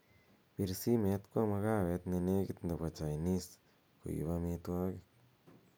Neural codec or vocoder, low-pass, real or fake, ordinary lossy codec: none; none; real; none